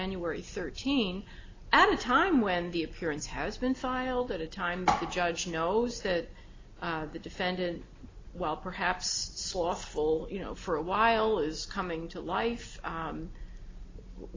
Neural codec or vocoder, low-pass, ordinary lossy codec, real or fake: none; 7.2 kHz; AAC, 32 kbps; real